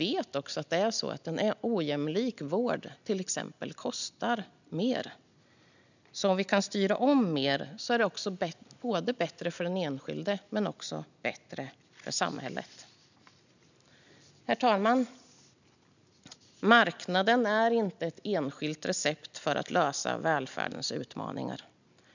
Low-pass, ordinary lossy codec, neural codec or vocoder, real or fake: 7.2 kHz; none; none; real